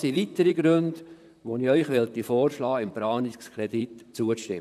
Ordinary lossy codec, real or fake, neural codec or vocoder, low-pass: none; fake; vocoder, 44.1 kHz, 128 mel bands, Pupu-Vocoder; 14.4 kHz